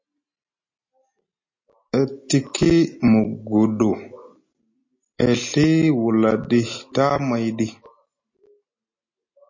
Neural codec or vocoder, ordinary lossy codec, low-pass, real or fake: none; MP3, 32 kbps; 7.2 kHz; real